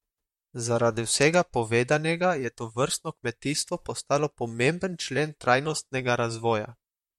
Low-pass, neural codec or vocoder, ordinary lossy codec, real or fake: 19.8 kHz; vocoder, 44.1 kHz, 128 mel bands, Pupu-Vocoder; MP3, 64 kbps; fake